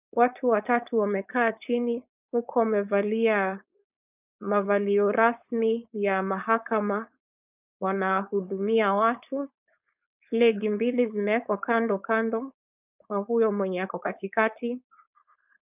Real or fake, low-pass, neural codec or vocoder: fake; 3.6 kHz; codec, 16 kHz, 4.8 kbps, FACodec